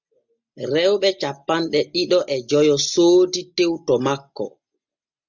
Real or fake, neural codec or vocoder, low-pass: real; none; 7.2 kHz